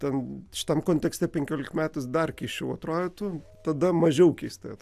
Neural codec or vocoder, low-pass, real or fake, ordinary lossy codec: none; 14.4 kHz; real; AAC, 96 kbps